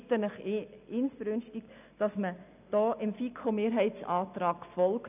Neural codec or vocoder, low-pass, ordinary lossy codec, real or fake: none; 3.6 kHz; none; real